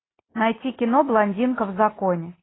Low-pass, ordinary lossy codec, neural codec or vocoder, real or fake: 7.2 kHz; AAC, 16 kbps; none; real